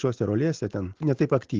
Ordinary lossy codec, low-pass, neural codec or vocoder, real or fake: Opus, 16 kbps; 7.2 kHz; none; real